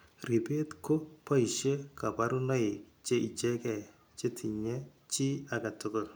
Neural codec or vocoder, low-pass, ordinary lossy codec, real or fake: none; none; none; real